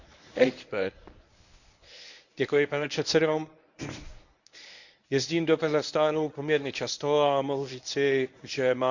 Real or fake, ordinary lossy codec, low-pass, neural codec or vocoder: fake; none; 7.2 kHz; codec, 24 kHz, 0.9 kbps, WavTokenizer, medium speech release version 1